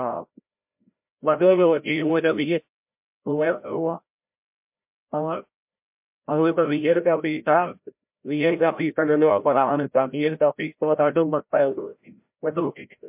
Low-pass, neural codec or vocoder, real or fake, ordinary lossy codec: 3.6 kHz; codec, 16 kHz, 0.5 kbps, FreqCodec, larger model; fake; MP3, 32 kbps